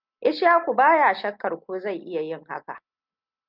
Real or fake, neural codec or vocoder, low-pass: real; none; 5.4 kHz